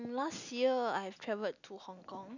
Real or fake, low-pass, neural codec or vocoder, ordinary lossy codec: real; 7.2 kHz; none; none